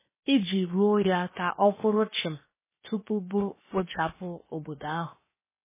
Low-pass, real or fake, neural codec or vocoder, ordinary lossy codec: 3.6 kHz; fake; codec, 16 kHz, 0.7 kbps, FocalCodec; MP3, 16 kbps